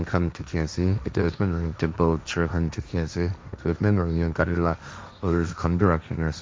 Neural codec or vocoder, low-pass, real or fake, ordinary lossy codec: codec, 16 kHz, 1.1 kbps, Voila-Tokenizer; none; fake; none